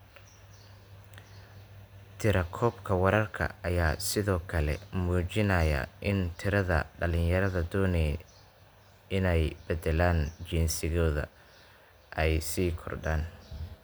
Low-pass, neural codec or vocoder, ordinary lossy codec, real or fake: none; none; none; real